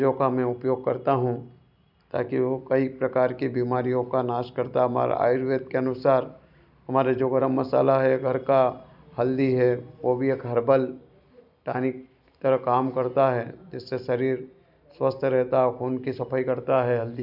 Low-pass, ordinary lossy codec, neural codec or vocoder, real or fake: 5.4 kHz; none; none; real